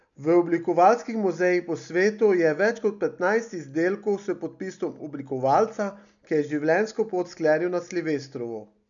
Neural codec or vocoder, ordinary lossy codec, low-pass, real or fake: none; none; 7.2 kHz; real